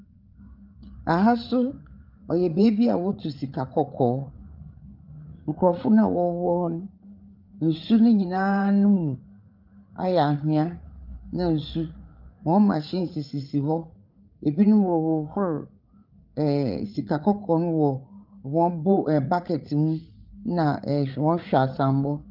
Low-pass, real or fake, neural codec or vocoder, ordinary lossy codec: 5.4 kHz; fake; codec, 16 kHz, 8 kbps, FreqCodec, larger model; Opus, 32 kbps